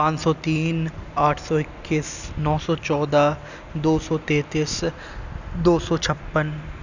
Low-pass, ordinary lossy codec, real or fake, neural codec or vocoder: 7.2 kHz; none; real; none